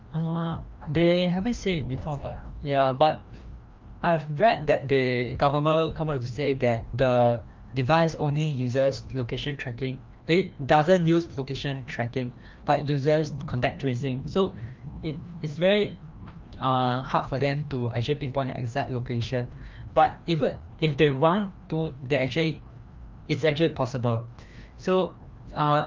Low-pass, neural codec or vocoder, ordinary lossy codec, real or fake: 7.2 kHz; codec, 16 kHz, 1 kbps, FreqCodec, larger model; Opus, 24 kbps; fake